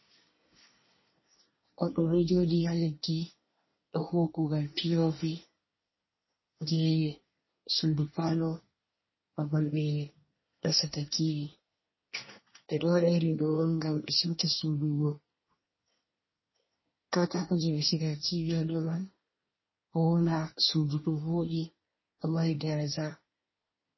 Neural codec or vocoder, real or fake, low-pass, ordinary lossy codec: codec, 24 kHz, 1 kbps, SNAC; fake; 7.2 kHz; MP3, 24 kbps